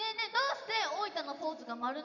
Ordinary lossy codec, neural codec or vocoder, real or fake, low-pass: none; none; real; 7.2 kHz